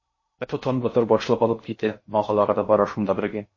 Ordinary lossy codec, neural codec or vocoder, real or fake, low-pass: MP3, 32 kbps; codec, 16 kHz in and 24 kHz out, 0.6 kbps, FocalCodec, streaming, 2048 codes; fake; 7.2 kHz